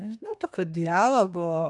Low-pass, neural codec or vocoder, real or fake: 10.8 kHz; codec, 24 kHz, 1 kbps, SNAC; fake